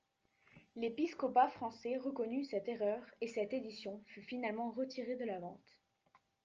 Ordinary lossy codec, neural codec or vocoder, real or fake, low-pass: Opus, 24 kbps; none; real; 7.2 kHz